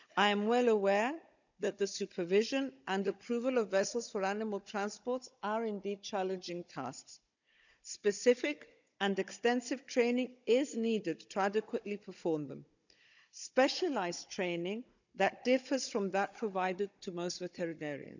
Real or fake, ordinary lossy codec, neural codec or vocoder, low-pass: fake; none; codec, 16 kHz, 16 kbps, FunCodec, trained on Chinese and English, 50 frames a second; 7.2 kHz